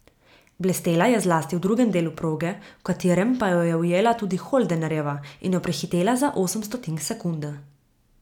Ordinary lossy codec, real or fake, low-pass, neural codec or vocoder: none; real; 19.8 kHz; none